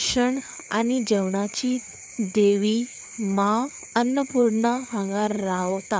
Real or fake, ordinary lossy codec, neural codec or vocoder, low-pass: fake; none; codec, 16 kHz, 4 kbps, FreqCodec, larger model; none